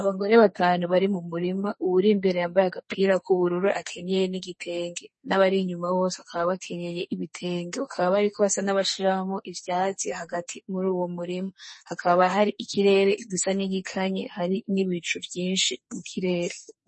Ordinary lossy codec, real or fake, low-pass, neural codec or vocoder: MP3, 32 kbps; fake; 10.8 kHz; codec, 44.1 kHz, 2.6 kbps, SNAC